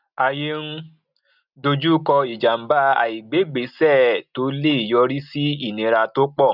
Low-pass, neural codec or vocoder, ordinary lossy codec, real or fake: 5.4 kHz; none; none; real